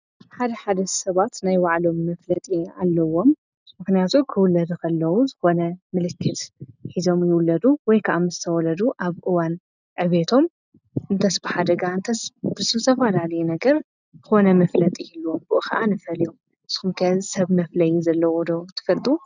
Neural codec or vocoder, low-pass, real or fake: none; 7.2 kHz; real